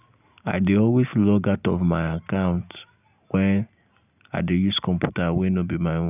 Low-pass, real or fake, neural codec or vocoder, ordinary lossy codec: 3.6 kHz; real; none; none